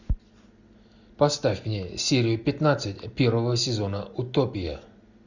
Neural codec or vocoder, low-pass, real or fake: none; 7.2 kHz; real